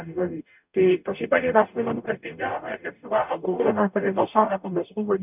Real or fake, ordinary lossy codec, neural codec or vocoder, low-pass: fake; none; codec, 44.1 kHz, 0.9 kbps, DAC; 3.6 kHz